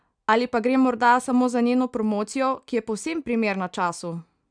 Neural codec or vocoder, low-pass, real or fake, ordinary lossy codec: none; 9.9 kHz; real; none